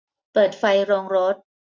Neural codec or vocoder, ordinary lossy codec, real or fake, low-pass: none; none; real; none